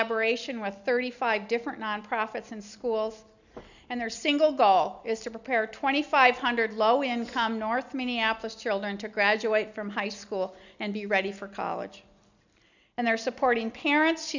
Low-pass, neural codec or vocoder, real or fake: 7.2 kHz; none; real